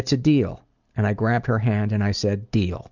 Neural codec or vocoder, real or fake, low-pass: none; real; 7.2 kHz